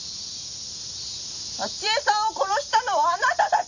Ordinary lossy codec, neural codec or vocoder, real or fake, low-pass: none; none; real; 7.2 kHz